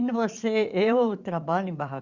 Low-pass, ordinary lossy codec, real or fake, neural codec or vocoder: 7.2 kHz; Opus, 64 kbps; fake; vocoder, 44.1 kHz, 80 mel bands, Vocos